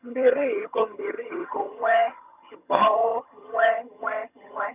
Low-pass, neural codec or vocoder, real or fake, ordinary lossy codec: 3.6 kHz; vocoder, 22.05 kHz, 80 mel bands, HiFi-GAN; fake; none